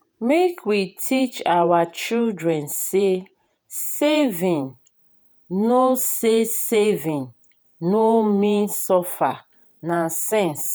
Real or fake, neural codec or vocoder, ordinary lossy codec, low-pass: fake; vocoder, 48 kHz, 128 mel bands, Vocos; none; none